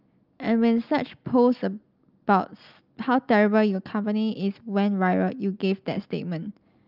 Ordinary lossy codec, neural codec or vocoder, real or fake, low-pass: Opus, 24 kbps; none; real; 5.4 kHz